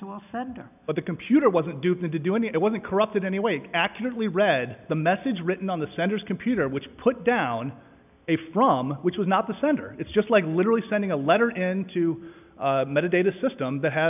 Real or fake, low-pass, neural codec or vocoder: real; 3.6 kHz; none